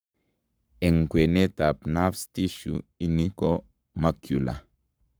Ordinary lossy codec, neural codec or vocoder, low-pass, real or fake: none; codec, 44.1 kHz, 7.8 kbps, Pupu-Codec; none; fake